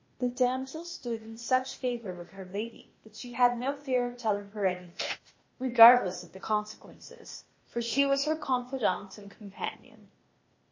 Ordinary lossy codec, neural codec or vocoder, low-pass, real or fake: MP3, 32 kbps; codec, 16 kHz, 0.8 kbps, ZipCodec; 7.2 kHz; fake